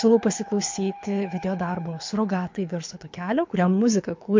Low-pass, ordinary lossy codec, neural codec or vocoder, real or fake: 7.2 kHz; MP3, 48 kbps; codec, 24 kHz, 6 kbps, HILCodec; fake